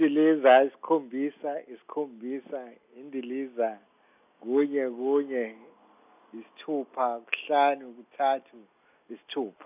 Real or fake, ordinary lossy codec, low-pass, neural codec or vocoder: real; none; 3.6 kHz; none